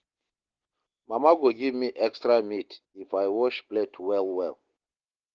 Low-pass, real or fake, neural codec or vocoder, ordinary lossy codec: 7.2 kHz; real; none; Opus, 16 kbps